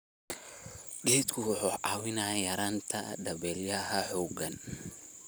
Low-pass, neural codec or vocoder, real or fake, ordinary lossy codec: none; none; real; none